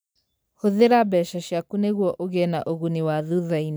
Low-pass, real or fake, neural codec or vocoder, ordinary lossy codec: none; real; none; none